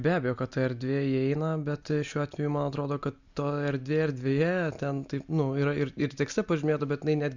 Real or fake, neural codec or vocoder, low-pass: real; none; 7.2 kHz